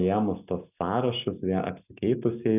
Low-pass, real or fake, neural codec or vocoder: 3.6 kHz; real; none